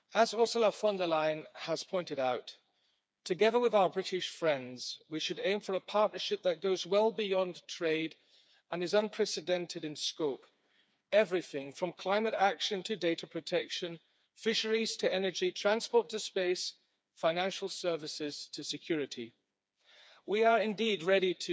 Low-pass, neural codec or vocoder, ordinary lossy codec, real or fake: none; codec, 16 kHz, 4 kbps, FreqCodec, smaller model; none; fake